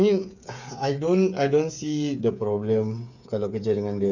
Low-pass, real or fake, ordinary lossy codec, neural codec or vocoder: 7.2 kHz; fake; none; codec, 16 kHz, 8 kbps, FreqCodec, smaller model